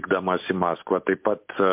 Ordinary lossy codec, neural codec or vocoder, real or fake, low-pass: MP3, 32 kbps; none; real; 3.6 kHz